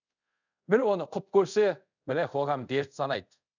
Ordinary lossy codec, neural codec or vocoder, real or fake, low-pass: none; codec, 24 kHz, 0.5 kbps, DualCodec; fake; 7.2 kHz